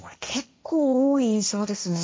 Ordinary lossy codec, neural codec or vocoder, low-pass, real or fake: none; codec, 16 kHz, 1.1 kbps, Voila-Tokenizer; none; fake